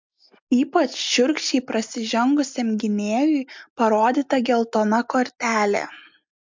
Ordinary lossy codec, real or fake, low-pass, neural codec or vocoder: MP3, 64 kbps; real; 7.2 kHz; none